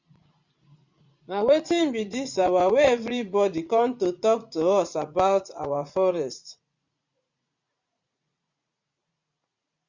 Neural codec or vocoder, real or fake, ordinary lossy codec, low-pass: vocoder, 44.1 kHz, 80 mel bands, Vocos; fake; Opus, 64 kbps; 7.2 kHz